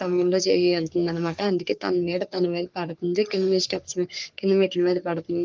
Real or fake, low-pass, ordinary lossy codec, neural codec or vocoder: fake; 7.2 kHz; Opus, 32 kbps; codec, 44.1 kHz, 3.4 kbps, Pupu-Codec